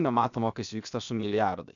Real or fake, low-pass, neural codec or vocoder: fake; 7.2 kHz; codec, 16 kHz, 0.7 kbps, FocalCodec